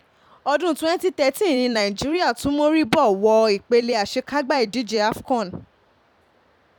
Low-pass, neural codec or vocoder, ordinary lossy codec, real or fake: 19.8 kHz; vocoder, 44.1 kHz, 128 mel bands every 256 samples, BigVGAN v2; none; fake